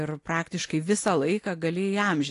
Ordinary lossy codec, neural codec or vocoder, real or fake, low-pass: AAC, 48 kbps; none; real; 10.8 kHz